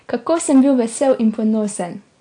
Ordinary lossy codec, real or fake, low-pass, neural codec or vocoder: AAC, 48 kbps; real; 9.9 kHz; none